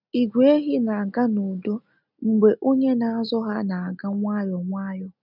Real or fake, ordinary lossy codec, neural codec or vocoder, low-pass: real; none; none; 5.4 kHz